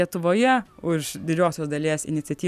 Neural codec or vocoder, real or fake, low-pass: none; real; 14.4 kHz